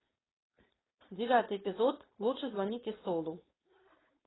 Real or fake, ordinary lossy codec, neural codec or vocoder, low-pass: fake; AAC, 16 kbps; codec, 16 kHz, 4.8 kbps, FACodec; 7.2 kHz